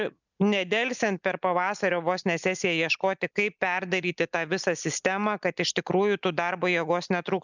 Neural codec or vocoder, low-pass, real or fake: none; 7.2 kHz; real